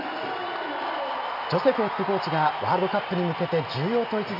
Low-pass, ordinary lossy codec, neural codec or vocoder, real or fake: 5.4 kHz; none; none; real